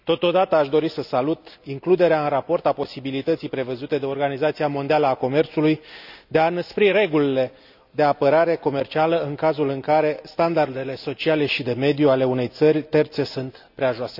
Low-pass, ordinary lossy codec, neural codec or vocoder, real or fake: 5.4 kHz; none; none; real